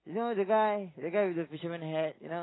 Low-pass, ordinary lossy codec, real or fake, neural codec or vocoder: 7.2 kHz; AAC, 16 kbps; real; none